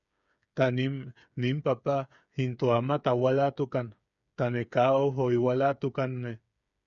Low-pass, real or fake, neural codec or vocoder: 7.2 kHz; fake; codec, 16 kHz, 8 kbps, FreqCodec, smaller model